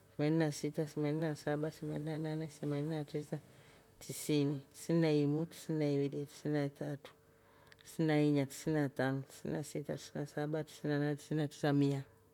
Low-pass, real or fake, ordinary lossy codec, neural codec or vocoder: 19.8 kHz; fake; none; vocoder, 44.1 kHz, 128 mel bands, Pupu-Vocoder